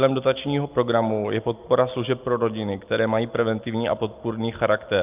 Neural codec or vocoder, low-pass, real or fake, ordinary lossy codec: none; 3.6 kHz; real; Opus, 24 kbps